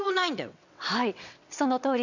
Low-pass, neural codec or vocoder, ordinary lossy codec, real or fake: 7.2 kHz; vocoder, 22.05 kHz, 80 mel bands, WaveNeXt; none; fake